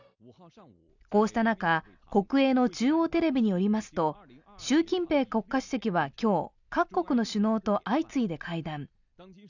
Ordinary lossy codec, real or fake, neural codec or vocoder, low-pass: none; real; none; 7.2 kHz